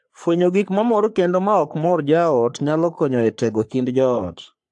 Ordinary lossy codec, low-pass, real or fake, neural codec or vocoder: AAC, 64 kbps; 10.8 kHz; fake; codec, 44.1 kHz, 3.4 kbps, Pupu-Codec